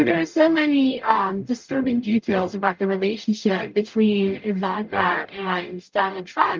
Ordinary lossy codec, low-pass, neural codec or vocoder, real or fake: Opus, 32 kbps; 7.2 kHz; codec, 44.1 kHz, 0.9 kbps, DAC; fake